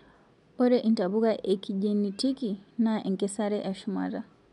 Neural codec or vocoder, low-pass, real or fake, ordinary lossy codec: none; 10.8 kHz; real; none